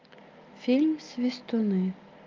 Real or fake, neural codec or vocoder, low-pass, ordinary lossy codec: real; none; 7.2 kHz; Opus, 24 kbps